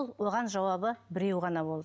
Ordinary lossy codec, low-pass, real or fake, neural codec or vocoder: none; none; real; none